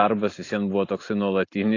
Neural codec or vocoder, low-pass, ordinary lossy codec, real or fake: none; 7.2 kHz; AAC, 32 kbps; real